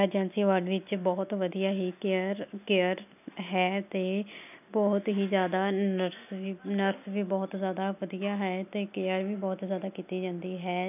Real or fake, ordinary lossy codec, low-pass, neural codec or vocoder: real; none; 3.6 kHz; none